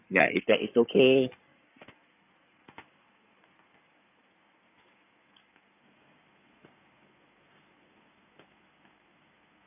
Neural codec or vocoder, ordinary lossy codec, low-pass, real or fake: codec, 16 kHz in and 24 kHz out, 2.2 kbps, FireRedTTS-2 codec; none; 3.6 kHz; fake